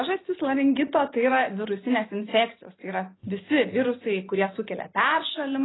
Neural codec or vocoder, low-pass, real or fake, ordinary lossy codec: none; 7.2 kHz; real; AAC, 16 kbps